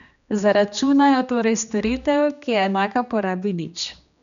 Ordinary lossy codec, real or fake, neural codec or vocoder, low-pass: none; fake; codec, 16 kHz, 2 kbps, X-Codec, HuBERT features, trained on general audio; 7.2 kHz